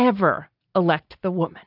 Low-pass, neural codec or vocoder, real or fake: 5.4 kHz; none; real